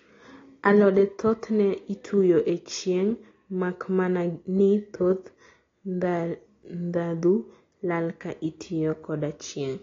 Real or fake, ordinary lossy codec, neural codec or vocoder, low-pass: real; AAC, 32 kbps; none; 7.2 kHz